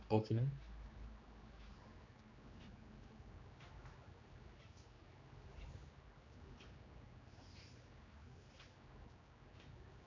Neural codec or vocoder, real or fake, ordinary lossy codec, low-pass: codec, 16 kHz, 2 kbps, X-Codec, HuBERT features, trained on general audio; fake; AAC, 32 kbps; 7.2 kHz